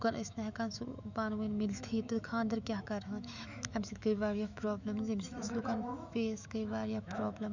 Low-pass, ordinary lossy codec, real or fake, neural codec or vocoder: 7.2 kHz; none; fake; autoencoder, 48 kHz, 128 numbers a frame, DAC-VAE, trained on Japanese speech